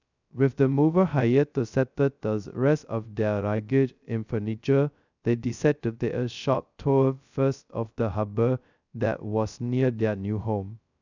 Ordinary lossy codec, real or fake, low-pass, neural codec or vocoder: none; fake; 7.2 kHz; codec, 16 kHz, 0.2 kbps, FocalCodec